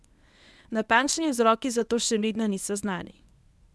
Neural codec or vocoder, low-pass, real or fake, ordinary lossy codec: codec, 24 kHz, 0.9 kbps, WavTokenizer, medium speech release version 1; none; fake; none